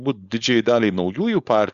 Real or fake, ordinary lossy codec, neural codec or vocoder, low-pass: fake; Opus, 64 kbps; codec, 16 kHz, 4.8 kbps, FACodec; 7.2 kHz